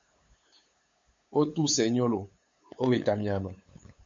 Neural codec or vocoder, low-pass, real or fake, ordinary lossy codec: codec, 16 kHz, 8 kbps, FunCodec, trained on LibriTTS, 25 frames a second; 7.2 kHz; fake; MP3, 48 kbps